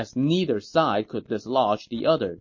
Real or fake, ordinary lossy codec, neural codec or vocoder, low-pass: real; MP3, 32 kbps; none; 7.2 kHz